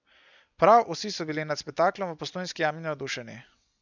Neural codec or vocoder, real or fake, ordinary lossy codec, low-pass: none; real; none; 7.2 kHz